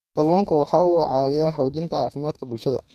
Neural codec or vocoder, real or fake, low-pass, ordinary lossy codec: codec, 44.1 kHz, 2.6 kbps, DAC; fake; 14.4 kHz; none